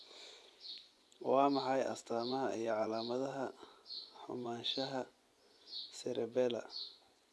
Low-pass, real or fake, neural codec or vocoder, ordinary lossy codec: none; fake; vocoder, 24 kHz, 100 mel bands, Vocos; none